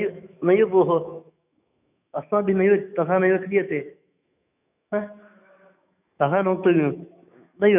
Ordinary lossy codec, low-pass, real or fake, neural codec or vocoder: none; 3.6 kHz; fake; autoencoder, 48 kHz, 128 numbers a frame, DAC-VAE, trained on Japanese speech